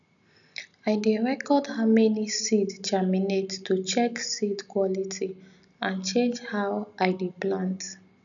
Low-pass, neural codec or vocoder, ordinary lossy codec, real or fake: 7.2 kHz; none; none; real